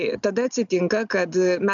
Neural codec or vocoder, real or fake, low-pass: none; real; 7.2 kHz